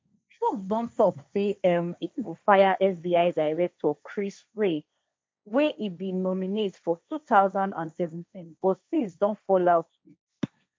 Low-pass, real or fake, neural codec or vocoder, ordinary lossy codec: none; fake; codec, 16 kHz, 1.1 kbps, Voila-Tokenizer; none